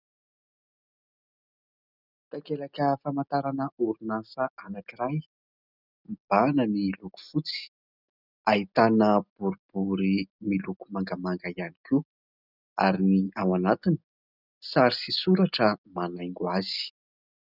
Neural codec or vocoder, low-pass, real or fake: none; 5.4 kHz; real